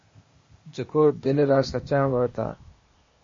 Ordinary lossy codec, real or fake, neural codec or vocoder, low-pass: MP3, 32 kbps; fake; codec, 16 kHz, 0.8 kbps, ZipCodec; 7.2 kHz